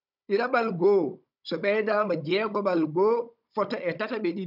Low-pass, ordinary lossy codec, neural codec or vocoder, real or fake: 5.4 kHz; none; codec, 16 kHz, 16 kbps, FunCodec, trained on Chinese and English, 50 frames a second; fake